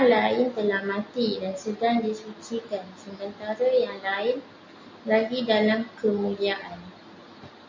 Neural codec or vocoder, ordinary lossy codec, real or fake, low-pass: none; MP3, 48 kbps; real; 7.2 kHz